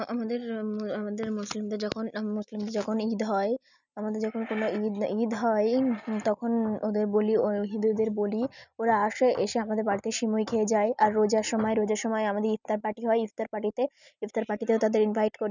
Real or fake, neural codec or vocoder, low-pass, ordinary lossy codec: real; none; 7.2 kHz; none